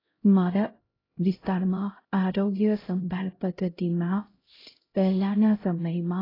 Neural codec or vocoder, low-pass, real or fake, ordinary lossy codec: codec, 16 kHz, 0.5 kbps, X-Codec, HuBERT features, trained on LibriSpeech; 5.4 kHz; fake; AAC, 24 kbps